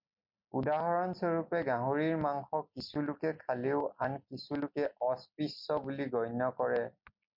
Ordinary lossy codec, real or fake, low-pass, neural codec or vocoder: MP3, 48 kbps; real; 5.4 kHz; none